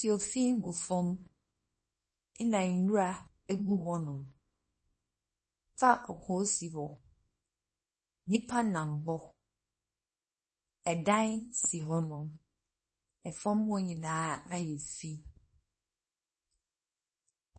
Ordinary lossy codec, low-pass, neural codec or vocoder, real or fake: MP3, 32 kbps; 10.8 kHz; codec, 24 kHz, 0.9 kbps, WavTokenizer, small release; fake